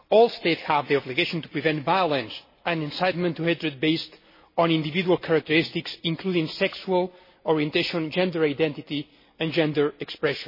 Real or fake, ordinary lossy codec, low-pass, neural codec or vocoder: real; MP3, 24 kbps; 5.4 kHz; none